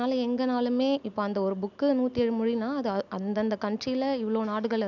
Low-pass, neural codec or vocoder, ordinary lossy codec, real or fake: 7.2 kHz; none; none; real